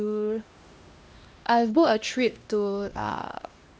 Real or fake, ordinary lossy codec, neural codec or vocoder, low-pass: fake; none; codec, 16 kHz, 1 kbps, X-Codec, HuBERT features, trained on LibriSpeech; none